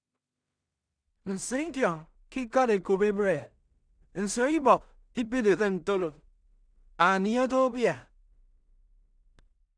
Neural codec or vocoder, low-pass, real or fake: codec, 16 kHz in and 24 kHz out, 0.4 kbps, LongCat-Audio-Codec, two codebook decoder; 9.9 kHz; fake